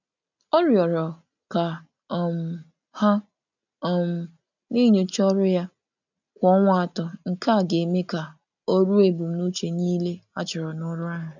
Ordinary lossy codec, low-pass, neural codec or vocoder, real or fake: none; 7.2 kHz; none; real